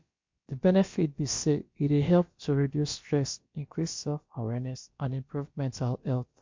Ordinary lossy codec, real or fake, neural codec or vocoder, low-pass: MP3, 48 kbps; fake; codec, 16 kHz, about 1 kbps, DyCAST, with the encoder's durations; 7.2 kHz